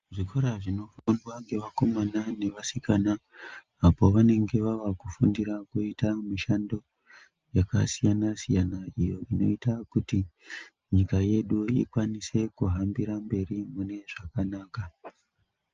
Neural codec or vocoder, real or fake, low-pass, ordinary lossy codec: none; real; 7.2 kHz; Opus, 24 kbps